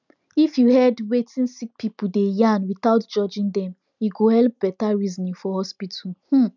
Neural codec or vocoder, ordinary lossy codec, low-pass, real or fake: none; none; 7.2 kHz; real